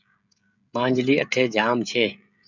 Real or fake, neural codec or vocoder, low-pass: fake; codec, 16 kHz, 16 kbps, FreqCodec, smaller model; 7.2 kHz